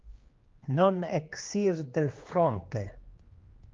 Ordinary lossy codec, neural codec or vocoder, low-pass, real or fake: Opus, 32 kbps; codec, 16 kHz, 2 kbps, X-Codec, HuBERT features, trained on general audio; 7.2 kHz; fake